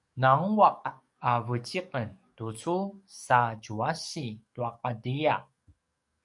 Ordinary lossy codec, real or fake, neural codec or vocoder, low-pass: MP3, 96 kbps; fake; codec, 44.1 kHz, 7.8 kbps, DAC; 10.8 kHz